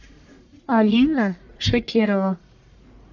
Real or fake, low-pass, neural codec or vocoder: fake; 7.2 kHz; codec, 44.1 kHz, 1.7 kbps, Pupu-Codec